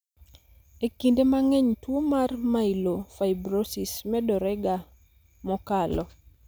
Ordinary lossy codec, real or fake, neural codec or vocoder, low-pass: none; real; none; none